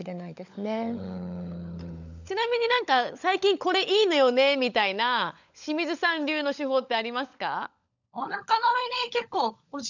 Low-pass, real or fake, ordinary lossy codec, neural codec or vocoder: 7.2 kHz; fake; none; codec, 16 kHz, 16 kbps, FunCodec, trained on LibriTTS, 50 frames a second